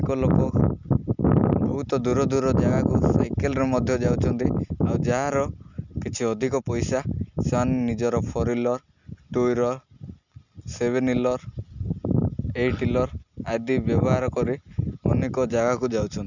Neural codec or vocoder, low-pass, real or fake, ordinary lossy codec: none; 7.2 kHz; real; none